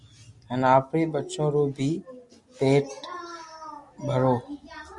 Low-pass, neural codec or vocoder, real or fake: 10.8 kHz; none; real